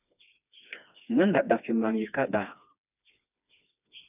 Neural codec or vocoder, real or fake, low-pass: codec, 16 kHz, 2 kbps, FreqCodec, smaller model; fake; 3.6 kHz